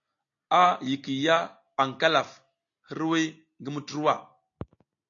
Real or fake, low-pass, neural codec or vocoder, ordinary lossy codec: real; 7.2 kHz; none; AAC, 48 kbps